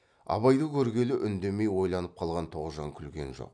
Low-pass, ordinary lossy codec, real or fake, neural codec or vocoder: 9.9 kHz; none; real; none